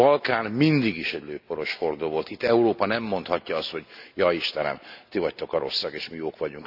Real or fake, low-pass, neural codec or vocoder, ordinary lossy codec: real; 5.4 kHz; none; AAC, 48 kbps